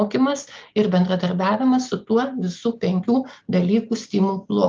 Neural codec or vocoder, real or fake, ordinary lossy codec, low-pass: none; real; Opus, 24 kbps; 7.2 kHz